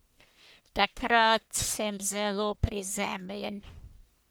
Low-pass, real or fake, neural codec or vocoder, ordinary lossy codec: none; fake; codec, 44.1 kHz, 1.7 kbps, Pupu-Codec; none